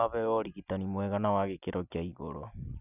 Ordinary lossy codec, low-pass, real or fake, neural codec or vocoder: none; 3.6 kHz; real; none